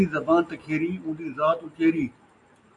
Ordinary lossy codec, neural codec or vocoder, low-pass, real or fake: AAC, 64 kbps; none; 10.8 kHz; real